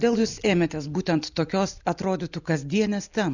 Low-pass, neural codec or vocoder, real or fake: 7.2 kHz; none; real